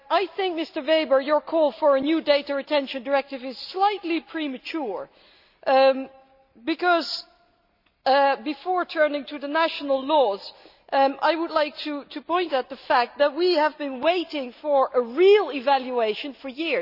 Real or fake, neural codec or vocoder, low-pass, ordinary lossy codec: real; none; 5.4 kHz; none